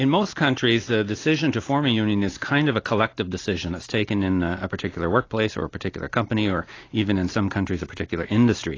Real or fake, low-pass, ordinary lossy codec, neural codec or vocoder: real; 7.2 kHz; AAC, 32 kbps; none